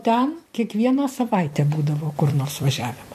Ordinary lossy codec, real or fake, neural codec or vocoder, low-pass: MP3, 64 kbps; fake; vocoder, 44.1 kHz, 128 mel bands every 256 samples, BigVGAN v2; 14.4 kHz